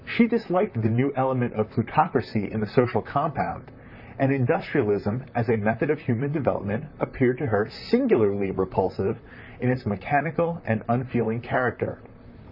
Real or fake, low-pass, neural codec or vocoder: fake; 5.4 kHz; vocoder, 44.1 kHz, 128 mel bands, Pupu-Vocoder